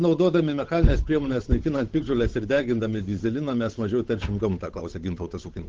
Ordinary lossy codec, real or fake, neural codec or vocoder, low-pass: Opus, 16 kbps; fake; codec, 16 kHz, 8 kbps, FunCodec, trained on Chinese and English, 25 frames a second; 7.2 kHz